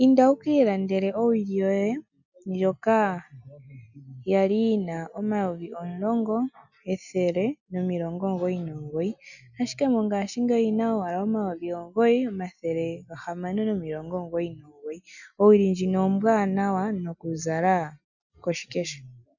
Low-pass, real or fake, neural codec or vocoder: 7.2 kHz; real; none